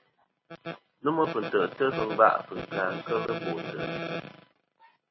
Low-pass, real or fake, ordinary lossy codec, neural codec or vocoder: 7.2 kHz; real; MP3, 24 kbps; none